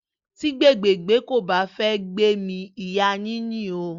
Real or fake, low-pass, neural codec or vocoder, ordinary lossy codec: real; 7.2 kHz; none; Opus, 64 kbps